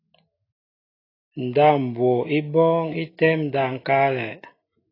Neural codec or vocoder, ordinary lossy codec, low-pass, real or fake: none; AAC, 24 kbps; 5.4 kHz; real